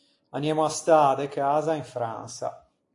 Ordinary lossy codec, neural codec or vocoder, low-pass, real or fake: AAC, 48 kbps; none; 10.8 kHz; real